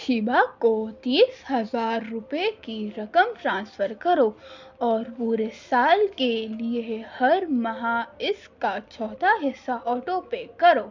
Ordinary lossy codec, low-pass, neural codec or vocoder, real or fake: MP3, 64 kbps; 7.2 kHz; none; real